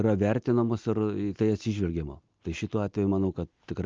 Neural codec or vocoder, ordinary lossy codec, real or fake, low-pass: none; Opus, 24 kbps; real; 7.2 kHz